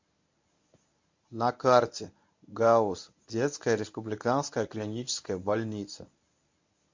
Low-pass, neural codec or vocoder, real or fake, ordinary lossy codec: 7.2 kHz; codec, 24 kHz, 0.9 kbps, WavTokenizer, medium speech release version 1; fake; MP3, 48 kbps